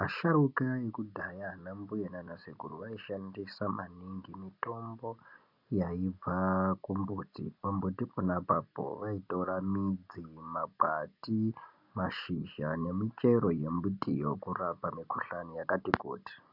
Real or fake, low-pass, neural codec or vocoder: real; 5.4 kHz; none